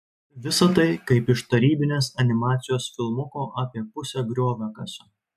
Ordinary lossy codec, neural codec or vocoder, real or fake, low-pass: MP3, 96 kbps; none; real; 14.4 kHz